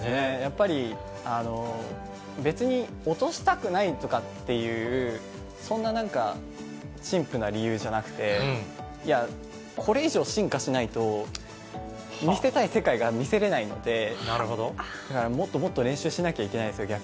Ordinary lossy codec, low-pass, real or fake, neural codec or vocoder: none; none; real; none